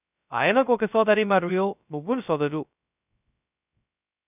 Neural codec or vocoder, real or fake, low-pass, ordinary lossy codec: codec, 16 kHz, 0.2 kbps, FocalCodec; fake; 3.6 kHz; none